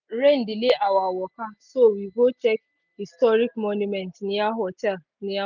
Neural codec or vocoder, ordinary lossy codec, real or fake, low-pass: none; none; real; 7.2 kHz